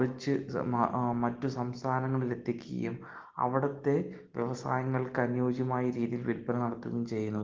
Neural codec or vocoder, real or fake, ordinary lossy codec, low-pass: none; real; Opus, 16 kbps; 7.2 kHz